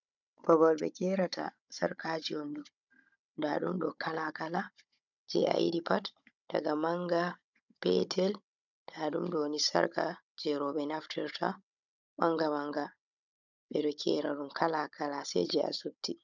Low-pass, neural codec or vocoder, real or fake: 7.2 kHz; codec, 16 kHz, 16 kbps, FunCodec, trained on Chinese and English, 50 frames a second; fake